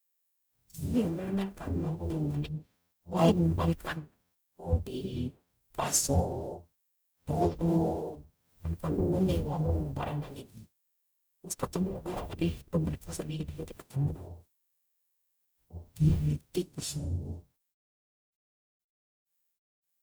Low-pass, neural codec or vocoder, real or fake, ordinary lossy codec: none; codec, 44.1 kHz, 0.9 kbps, DAC; fake; none